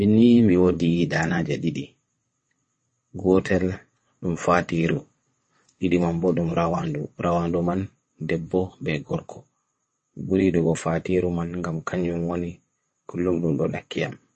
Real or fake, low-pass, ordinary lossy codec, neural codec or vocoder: fake; 9.9 kHz; MP3, 32 kbps; vocoder, 22.05 kHz, 80 mel bands, WaveNeXt